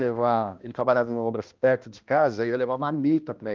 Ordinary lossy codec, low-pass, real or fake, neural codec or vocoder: Opus, 32 kbps; 7.2 kHz; fake; codec, 16 kHz, 1 kbps, X-Codec, HuBERT features, trained on balanced general audio